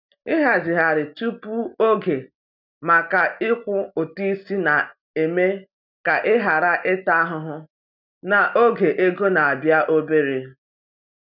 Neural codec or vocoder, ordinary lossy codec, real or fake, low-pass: none; none; real; 5.4 kHz